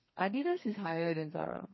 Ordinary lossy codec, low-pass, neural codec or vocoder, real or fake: MP3, 24 kbps; 7.2 kHz; codec, 32 kHz, 1.9 kbps, SNAC; fake